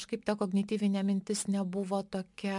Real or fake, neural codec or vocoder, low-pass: real; none; 10.8 kHz